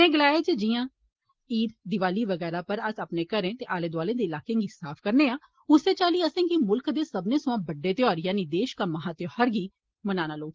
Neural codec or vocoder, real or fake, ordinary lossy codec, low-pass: none; real; Opus, 16 kbps; 7.2 kHz